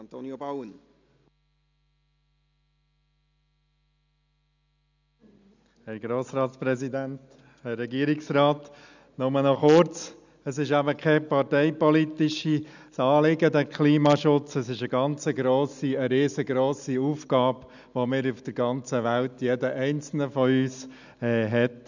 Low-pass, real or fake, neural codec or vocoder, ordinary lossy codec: 7.2 kHz; real; none; none